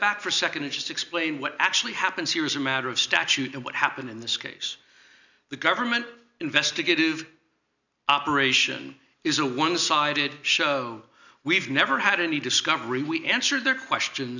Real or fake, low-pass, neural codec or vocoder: real; 7.2 kHz; none